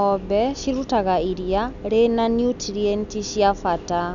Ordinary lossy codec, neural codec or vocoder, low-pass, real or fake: none; none; 7.2 kHz; real